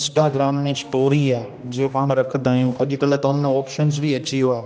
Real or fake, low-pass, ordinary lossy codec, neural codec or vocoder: fake; none; none; codec, 16 kHz, 1 kbps, X-Codec, HuBERT features, trained on general audio